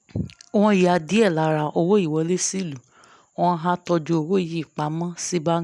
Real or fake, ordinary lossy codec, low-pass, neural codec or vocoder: real; none; none; none